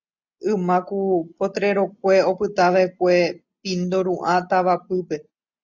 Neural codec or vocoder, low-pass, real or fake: none; 7.2 kHz; real